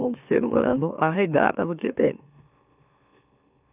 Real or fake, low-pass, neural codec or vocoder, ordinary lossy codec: fake; 3.6 kHz; autoencoder, 44.1 kHz, a latent of 192 numbers a frame, MeloTTS; none